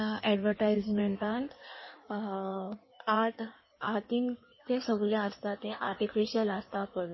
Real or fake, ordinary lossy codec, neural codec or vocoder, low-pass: fake; MP3, 24 kbps; codec, 16 kHz in and 24 kHz out, 1.1 kbps, FireRedTTS-2 codec; 7.2 kHz